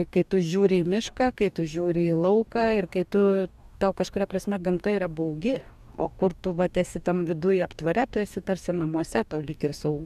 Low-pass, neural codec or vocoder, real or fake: 14.4 kHz; codec, 44.1 kHz, 2.6 kbps, DAC; fake